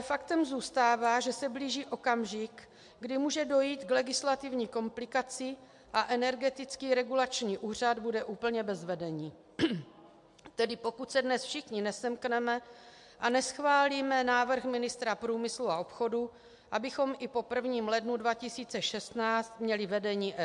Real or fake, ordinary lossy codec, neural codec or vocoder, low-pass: real; MP3, 64 kbps; none; 10.8 kHz